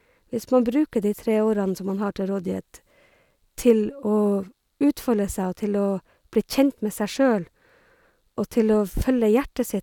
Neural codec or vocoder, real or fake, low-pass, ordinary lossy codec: none; real; 19.8 kHz; none